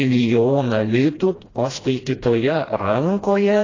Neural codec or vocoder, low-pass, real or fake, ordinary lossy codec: codec, 16 kHz, 1 kbps, FreqCodec, smaller model; 7.2 kHz; fake; AAC, 32 kbps